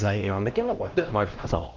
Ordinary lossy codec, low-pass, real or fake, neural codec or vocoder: Opus, 16 kbps; 7.2 kHz; fake; codec, 16 kHz, 1 kbps, X-Codec, HuBERT features, trained on LibriSpeech